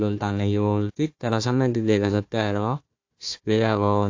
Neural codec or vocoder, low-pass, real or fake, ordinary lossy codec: codec, 16 kHz, 1 kbps, FunCodec, trained on Chinese and English, 50 frames a second; 7.2 kHz; fake; AAC, 48 kbps